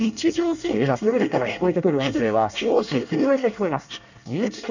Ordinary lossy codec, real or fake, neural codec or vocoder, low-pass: none; fake; codec, 24 kHz, 1 kbps, SNAC; 7.2 kHz